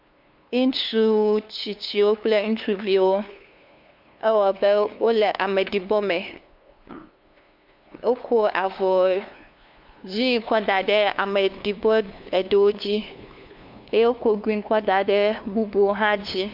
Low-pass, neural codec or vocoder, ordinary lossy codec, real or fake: 5.4 kHz; codec, 16 kHz, 2 kbps, FunCodec, trained on LibriTTS, 25 frames a second; MP3, 48 kbps; fake